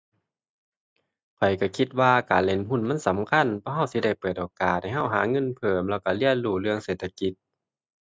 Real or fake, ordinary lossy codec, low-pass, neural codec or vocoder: real; none; none; none